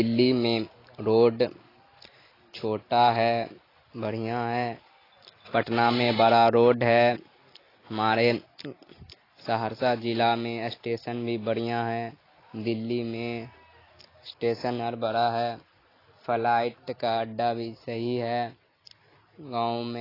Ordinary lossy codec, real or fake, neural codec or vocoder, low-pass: AAC, 24 kbps; real; none; 5.4 kHz